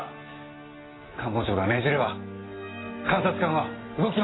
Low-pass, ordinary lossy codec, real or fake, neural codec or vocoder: 7.2 kHz; AAC, 16 kbps; real; none